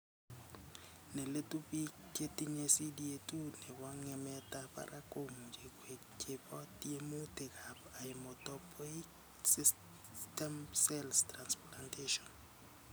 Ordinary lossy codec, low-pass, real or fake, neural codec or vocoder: none; none; real; none